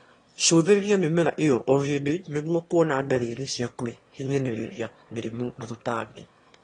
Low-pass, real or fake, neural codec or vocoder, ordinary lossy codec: 9.9 kHz; fake; autoencoder, 22.05 kHz, a latent of 192 numbers a frame, VITS, trained on one speaker; AAC, 32 kbps